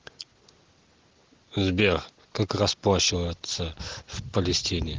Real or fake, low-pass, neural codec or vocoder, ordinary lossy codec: real; 7.2 kHz; none; Opus, 16 kbps